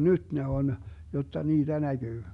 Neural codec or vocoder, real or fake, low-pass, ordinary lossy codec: none; real; 10.8 kHz; none